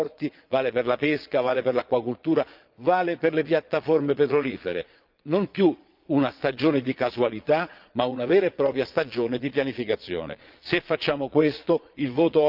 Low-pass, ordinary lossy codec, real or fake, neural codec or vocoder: 5.4 kHz; Opus, 24 kbps; fake; vocoder, 22.05 kHz, 80 mel bands, Vocos